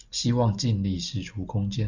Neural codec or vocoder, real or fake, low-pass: none; real; 7.2 kHz